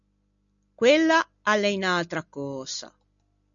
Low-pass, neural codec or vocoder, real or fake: 7.2 kHz; none; real